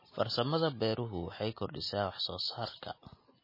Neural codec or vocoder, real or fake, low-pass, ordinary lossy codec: none; real; 5.4 kHz; MP3, 24 kbps